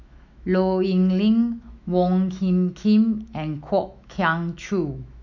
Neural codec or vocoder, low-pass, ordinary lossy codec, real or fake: autoencoder, 48 kHz, 128 numbers a frame, DAC-VAE, trained on Japanese speech; 7.2 kHz; none; fake